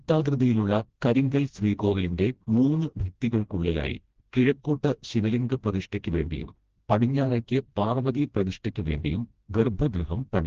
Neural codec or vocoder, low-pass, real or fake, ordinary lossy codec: codec, 16 kHz, 1 kbps, FreqCodec, smaller model; 7.2 kHz; fake; Opus, 16 kbps